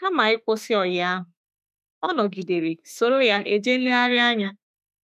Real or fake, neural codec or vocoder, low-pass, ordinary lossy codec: fake; autoencoder, 48 kHz, 32 numbers a frame, DAC-VAE, trained on Japanese speech; 14.4 kHz; none